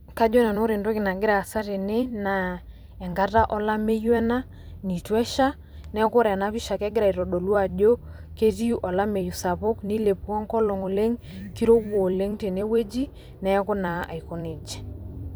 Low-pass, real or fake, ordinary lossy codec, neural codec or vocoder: none; fake; none; vocoder, 44.1 kHz, 128 mel bands every 256 samples, BigVGAN v2